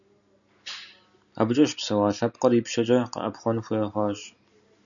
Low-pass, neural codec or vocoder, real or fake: 7.2 kHz; none; real